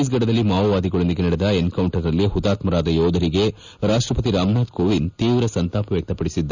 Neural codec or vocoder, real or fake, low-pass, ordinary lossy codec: none; real; 7.2 kHz; none